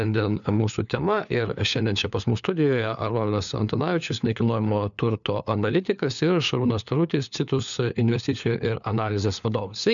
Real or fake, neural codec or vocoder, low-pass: fake; codec, 16 kHz, 4 kbps, FunCodec, trained on LibriTTS, 50 frames a second; 7.2 kHz